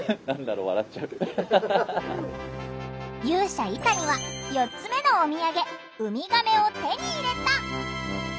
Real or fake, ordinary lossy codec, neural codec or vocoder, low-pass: real; none; none; none